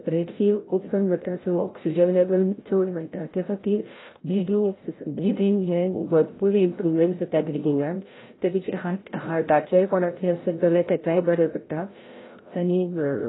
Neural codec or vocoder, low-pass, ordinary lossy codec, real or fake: codec, 16 kHz, 0.5 kbps, FreqCodec, larger model; 7.2 kHz; AAC, 16 kbps; fake